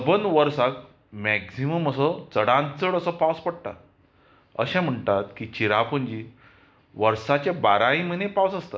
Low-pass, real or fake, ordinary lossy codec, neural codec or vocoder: none; real; none; none